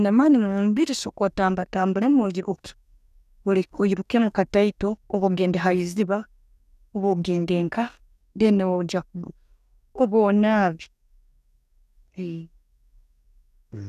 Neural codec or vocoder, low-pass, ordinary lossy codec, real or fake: codec, 44.1 kHz, 2.6 kbps, DAC; 14.4 kHz; none; fake